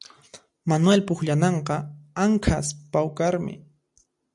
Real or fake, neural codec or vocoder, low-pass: real; none; 10.8 kHz